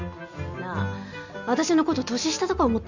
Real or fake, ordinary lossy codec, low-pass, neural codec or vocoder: real; none; 7.2 kHz; none